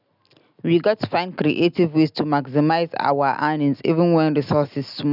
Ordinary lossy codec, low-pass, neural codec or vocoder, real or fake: none; 5.4 kHz; none; real